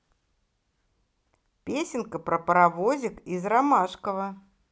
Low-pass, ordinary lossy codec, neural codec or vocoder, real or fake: none; none; none; real